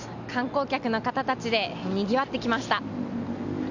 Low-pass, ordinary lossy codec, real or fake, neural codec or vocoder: 7.2 kHz; none; real; none